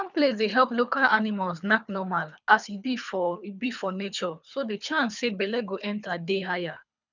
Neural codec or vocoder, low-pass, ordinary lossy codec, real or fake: codec, 24 kHz, 3 kbps, HILCodec; 7.2 kHz; none; fake